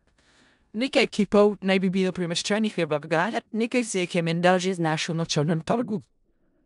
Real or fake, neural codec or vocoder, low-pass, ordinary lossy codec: fake; codec, 16 kHz in and 24 kHz out, 0.4 kbps, LongCat-Audio-Codec, four codebook decoder; 10.8 kHz; none